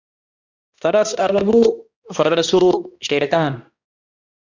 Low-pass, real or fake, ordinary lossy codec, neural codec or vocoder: 7.2 kHz; fake; Opus, 64 kbps; codec, 16 kHz, 1 kbps, X-Codec, HuBERT features, trained on balanced general audio